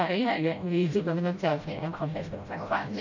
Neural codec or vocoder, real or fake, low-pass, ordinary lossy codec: codec, 16 kHz, 0.5 kbps, FreqCodec, smaller model; fake; 7.2 kHz; MP3, 64 kbps